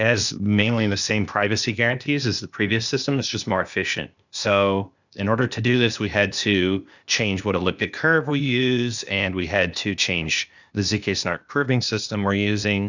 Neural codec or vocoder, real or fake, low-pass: codec, 16 kHz, 0.8 kbps, ZipCodec; fake; 7.2 kHz